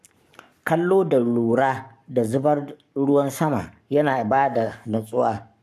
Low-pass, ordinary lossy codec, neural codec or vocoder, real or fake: 14.4 kHz; AAC, 96 kbps; codec, 44.1 kHz, 7.8 kbps, Pupu-Codec; fake